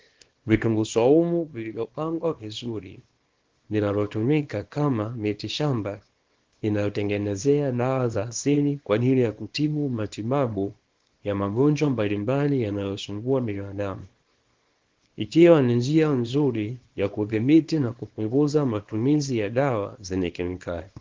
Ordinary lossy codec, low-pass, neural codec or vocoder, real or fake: Opus, 16 kbps; 7.2 kHz; codec, 24 kHz, 0.9 kbps, WavTokenizer, small release; fake